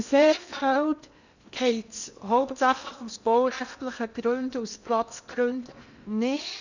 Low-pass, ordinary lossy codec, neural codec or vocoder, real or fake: 7.2 kHz; none; codec, 16 kHz in and 24 kHz out, 0.8 kbps, FocalCodec, streaming, 65536 codes; fake